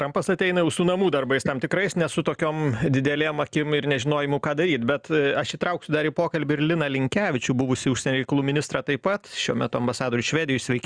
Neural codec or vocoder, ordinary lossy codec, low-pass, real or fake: none; Opus, 64 kbps; 9.9 kHz; real